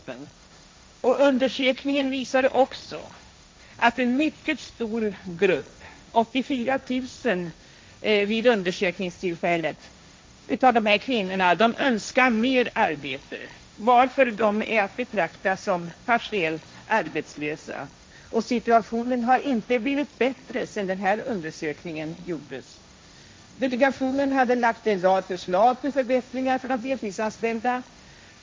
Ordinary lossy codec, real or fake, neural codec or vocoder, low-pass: none; fake; codec, 16 kHz, 1.1 kbps, Voila-Tokenizer; none